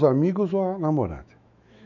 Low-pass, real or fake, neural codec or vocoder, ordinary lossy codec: 7.2 kHz; fake; autoencoder, 48 kHz, 128 numbers a frame, DAC-VAE, trained on Japanese speech; none